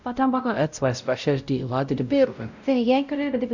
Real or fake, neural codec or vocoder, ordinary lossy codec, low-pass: fake; codec, 16 kHz, 0.5 kbps, X-Codec, WavLM features, trained on Multilingual LibriSpeech; none; 7.2 kHz